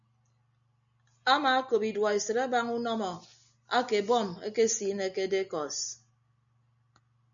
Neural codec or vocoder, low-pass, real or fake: none; 7.2 kHz; real